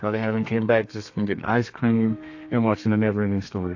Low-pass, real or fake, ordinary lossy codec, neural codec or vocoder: 7.2 kHz; fake; AAC, 48 kbps; codec, 32 kHz, 1.9 kbps, SNAC